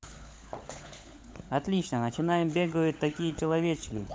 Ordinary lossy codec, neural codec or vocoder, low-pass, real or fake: none; codec, 16 kHz, 16 kbps, FunCodec, trained on LibriTTS, 50 frames a second; none; fake